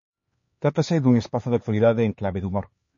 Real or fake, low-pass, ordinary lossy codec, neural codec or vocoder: fake; 7.2 kHz; MP3, 32 kbps; codec, 16 kHz, 4 kbps, X-Codec, HuBERT features, trained on LibriSpeech